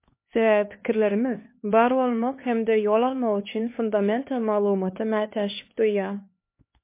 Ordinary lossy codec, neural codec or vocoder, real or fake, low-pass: MP3, 24 kbps; codec, 16 kHz, 4 kbps, X-Codec, HuBERT features, trained on LibriSpeech; fake; 3.6 kHz